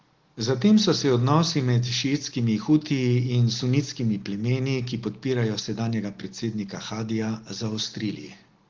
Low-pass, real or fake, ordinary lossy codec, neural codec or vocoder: 7.2 kHz; real; Opus, 16 kbps; none